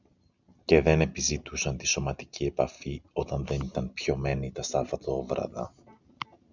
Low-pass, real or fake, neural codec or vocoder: 7.2 kHz; real; none